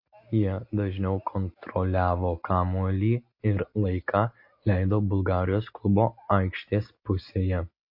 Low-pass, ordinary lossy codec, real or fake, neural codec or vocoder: 5.4 kHz; MP3, 32 kbps; real; none